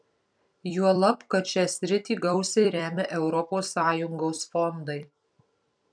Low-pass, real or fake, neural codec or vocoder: 9.9 kHz; fake; vocoder, 44.1 kHz, 128 mel bands every 256 samples, BigVGAN v2